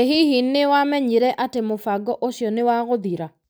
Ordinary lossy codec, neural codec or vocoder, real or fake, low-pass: none; none; real; none